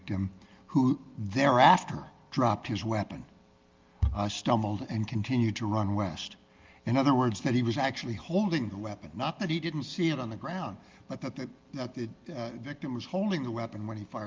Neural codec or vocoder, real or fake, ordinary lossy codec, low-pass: none; real; Opus, 32 kbps; 7.2 kHz